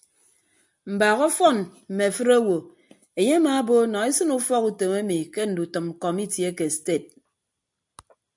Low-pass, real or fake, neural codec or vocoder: 10.8 kHz; real; none